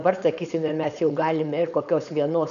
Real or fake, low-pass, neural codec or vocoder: fake; 7.2 kHz; codec, 16 kHz, 4.8 kbps, FACodec